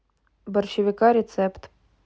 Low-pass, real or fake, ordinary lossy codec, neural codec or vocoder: none; real; none; none